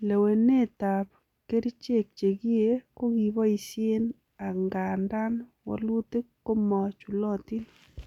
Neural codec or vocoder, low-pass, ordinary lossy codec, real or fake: none; 19.8 kHz; none; real